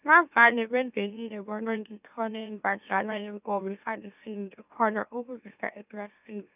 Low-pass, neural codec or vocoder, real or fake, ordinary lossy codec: 3.6 kHz; autoencoder, 44.1 kHz, a latent of 192 numbers a frame, MeloTTS; fake; none